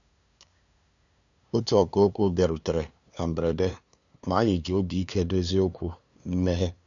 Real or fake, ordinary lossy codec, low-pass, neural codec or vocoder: fake; none; 7.2 kHz; codec, 16 kHz, 2 kbps, FunCodec, trained on LibriTTS, 25 frames a second